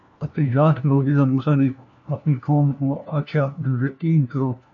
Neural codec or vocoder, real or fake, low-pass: codec, 16 kHz, 1 kbps, FunCodec, trained on LibriTTS, 50 frames a second; fake; 7.2 kHz